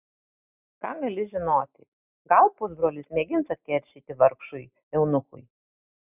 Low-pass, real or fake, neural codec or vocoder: 3.6 kHz; real; none